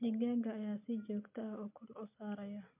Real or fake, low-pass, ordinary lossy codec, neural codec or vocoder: real; 3.6 kHz; MP3, 32 kbps; none